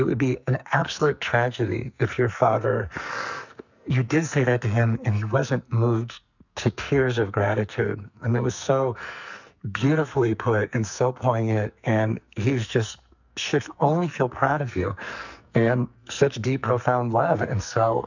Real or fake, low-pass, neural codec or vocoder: fake; 7.2 kHz; codec, 44.1 kHz, 2.6 kbps, SNAC